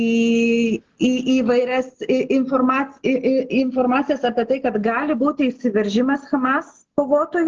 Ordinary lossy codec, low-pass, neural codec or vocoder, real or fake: Opus, 16 kbps; 7.2 kHz; none; real